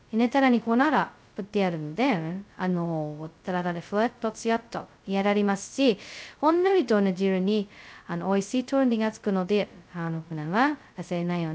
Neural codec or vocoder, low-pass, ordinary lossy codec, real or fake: codec, 16 kHz, 0.2 kbps, FocalCodec; none; none; fake